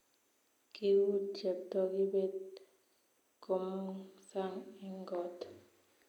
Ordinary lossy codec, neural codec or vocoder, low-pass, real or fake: none; none; 19.8 kHz; real